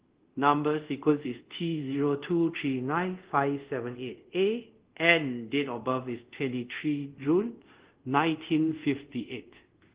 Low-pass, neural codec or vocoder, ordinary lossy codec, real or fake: 3.6 kHz; codec, 24 kHz, 0.5 kbps, DualCodec; Opus, 16 kbps; fake